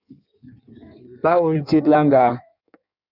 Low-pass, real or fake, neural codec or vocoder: 5.4 kHz; fake; codec, 16 kHz in and 24 kHz out, 1.1 kbps, FireRedTTS-2 codec